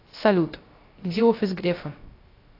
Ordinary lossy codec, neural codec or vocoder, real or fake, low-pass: AAC, 24 kbps; codec, 16 kHz, 0.3 kbps, FocalCodec; fake; 5.4 kHz